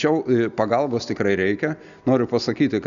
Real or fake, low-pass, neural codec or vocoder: real; 7.2 kHz; none